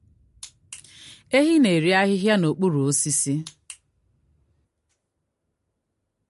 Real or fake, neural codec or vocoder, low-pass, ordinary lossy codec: real; none; 14.4 kHz; MP3, 48 kbps